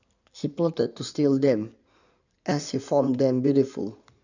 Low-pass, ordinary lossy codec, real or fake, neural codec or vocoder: 7.2 kHz; none; fake; codec, 16 kHz in and 24 kHz out, 2.2 kbps, FireRedTTS-2 codec